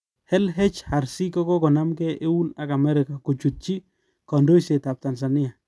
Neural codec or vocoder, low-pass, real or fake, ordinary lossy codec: none; none; real; none